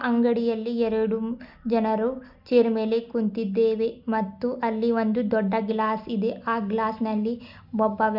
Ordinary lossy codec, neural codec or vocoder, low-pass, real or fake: none; none; 5.4 kHz; real